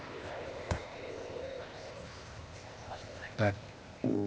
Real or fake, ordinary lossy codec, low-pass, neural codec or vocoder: fake; none; none; codec, 16 kHz, 0.8 kbps, ZipCodec